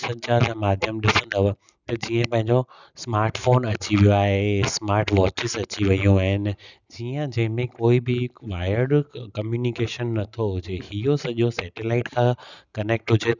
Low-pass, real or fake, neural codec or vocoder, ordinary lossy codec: 7.2 kHz; real; none; none